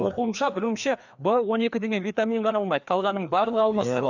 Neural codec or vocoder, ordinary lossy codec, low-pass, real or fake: codec, 16 kHz, 2 kbps, FreqCodec, larger model; none; 7.2 kHz; fake